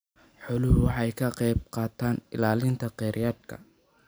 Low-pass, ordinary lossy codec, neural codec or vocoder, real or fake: none; none; none; real